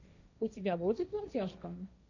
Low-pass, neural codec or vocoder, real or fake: 7.2 kHz; codec, 16 kHz, 1.1 kbps, Voila-Tokenizer; fake